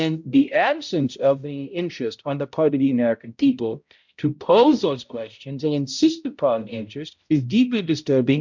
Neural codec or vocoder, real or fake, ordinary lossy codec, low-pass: codec, 16 kHz, 0.5 kbps, X-Codec, HuBERT features, trained on balanced general audio; fake; MP3, 64 kbps; 7.2 kHz